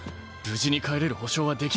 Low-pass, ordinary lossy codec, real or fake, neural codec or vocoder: none; none; real; none